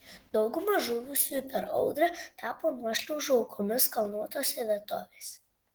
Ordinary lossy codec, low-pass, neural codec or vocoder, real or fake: Opus, 16 kbps; 19.8 kHz; autoencoder, 48 kHz, 128 numbers a frame, DAC-VAE, trained on Japanese speech; fake